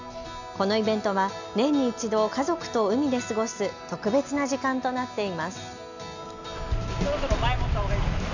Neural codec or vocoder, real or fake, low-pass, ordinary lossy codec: none; real; 7.2 kHz; none